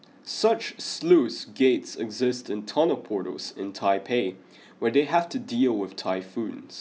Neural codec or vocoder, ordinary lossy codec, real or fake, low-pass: none; none; real; none